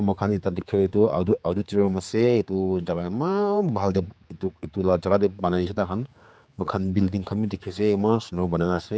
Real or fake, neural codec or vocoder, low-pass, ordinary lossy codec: fake; codec, 16 kHz, 4 kbps, X-Codec, HuBERT features, trained on general audio; none; none